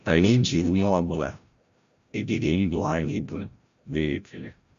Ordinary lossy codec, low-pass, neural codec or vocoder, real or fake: Opus, 64 kbps; 7.2 kHz; codec, 16 kHz, 0.5 kbps, FreqCodec, larger model; fake